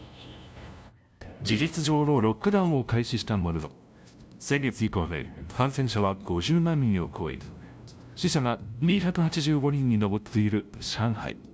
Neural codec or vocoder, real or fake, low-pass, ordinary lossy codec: codec, 16 kHz, 0.5 kbps, FunCodec, trained on LibriTTS, 25 frames a second; fake; none; none